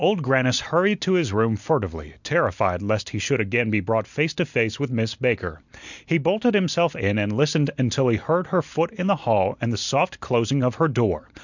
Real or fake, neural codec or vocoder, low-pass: real; none; 7.2 kHz